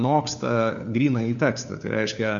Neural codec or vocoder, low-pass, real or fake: codec, 16 kHz, 4 kbps, FunCodec, trained on LibriTTS, 50 frames a second; 7.2 kHz; fake